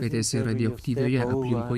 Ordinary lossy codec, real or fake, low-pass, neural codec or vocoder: AAC, 96 kbps; fake; 14.4 kHz; autoencoder, 48 kHz, 128 numbers a frame, DAC-VAE, trained on Japanese speech